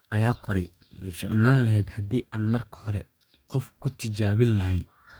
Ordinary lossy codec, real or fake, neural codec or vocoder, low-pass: none; fake; codec, 44.1 kHz, 2.6 kbps, DAC; none